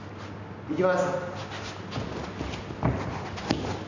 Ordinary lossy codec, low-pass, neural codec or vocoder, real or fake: none; 7.2 kHz; none; real